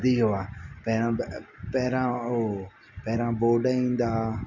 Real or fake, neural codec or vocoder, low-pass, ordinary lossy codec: real; none; 7.2 kHz; none